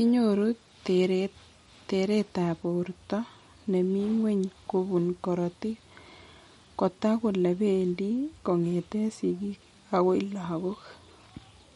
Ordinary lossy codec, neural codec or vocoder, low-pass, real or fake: MP3, 48 kbps; none; 19.8 kHz; real